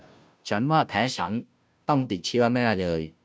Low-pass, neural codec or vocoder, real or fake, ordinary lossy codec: none; codec, 16 kHz, 0.5 kbps, FunCodec, trained on Chinese and English, 25 frames a second; fake; none